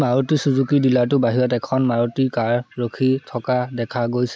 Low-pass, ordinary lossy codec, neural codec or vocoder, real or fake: none; none; none; real